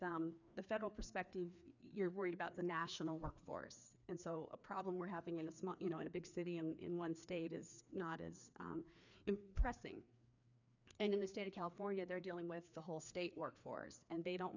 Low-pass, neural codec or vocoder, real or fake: 7.2 kHz; codec, 16 kHz, 2 kbps, FreqCodec, larger model; fake